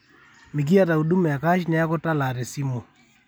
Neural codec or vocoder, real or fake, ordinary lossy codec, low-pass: none; real; none; none